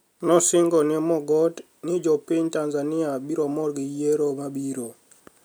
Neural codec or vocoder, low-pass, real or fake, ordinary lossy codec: none; none; real; none